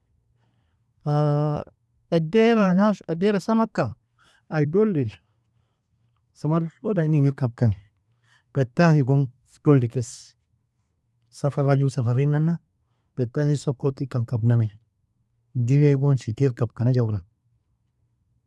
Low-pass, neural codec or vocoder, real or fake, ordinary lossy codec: none; codec, 24 kHz, 1 kbps, SNAC; fake; none